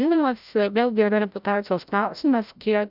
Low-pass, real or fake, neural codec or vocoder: 5.4 kHz; fake; codec, 16 kHz, 0.5 kbps, FreqCodec, larger model